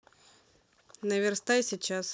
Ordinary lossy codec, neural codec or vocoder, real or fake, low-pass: none; none; real; none